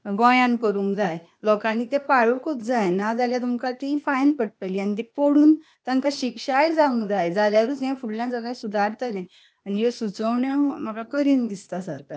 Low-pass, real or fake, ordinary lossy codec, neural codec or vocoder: none; fake; none; codec, 16 kHz, 0.8 kbps, ZipCodec